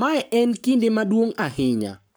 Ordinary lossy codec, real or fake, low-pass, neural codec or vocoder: none; fake; none; codec, 44.1 kHz, 7.8 kbps, Pupu-Codec